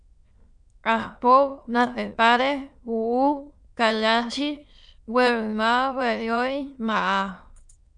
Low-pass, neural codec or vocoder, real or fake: 9.9 kHz; autoencoder, 22.05 kHz, a latent of 192 numbers a frame, VITS, trained on many speakers; fake